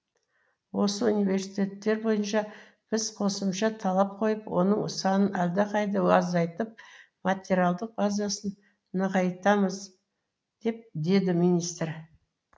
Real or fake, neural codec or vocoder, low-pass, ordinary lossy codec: real; none; none; none